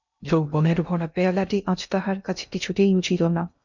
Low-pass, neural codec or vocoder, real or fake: 7.2 kHz; codec, 16 kHz in and 24 kHz out, 0.6 kbps, FocalCodec, streaming, 2048 codes; fake